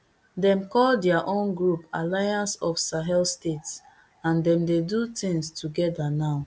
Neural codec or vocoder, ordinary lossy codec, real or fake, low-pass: none; none; real; none